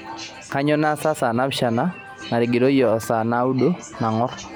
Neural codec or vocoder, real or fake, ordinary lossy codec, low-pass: none; real; none; none